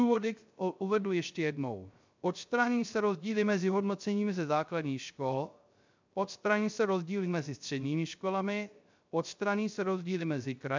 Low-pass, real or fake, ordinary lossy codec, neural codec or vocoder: 7.2 kHz; fake; MP3, 64 kbps; codec, 16 kHz, 0.3 kbps, FocalCodec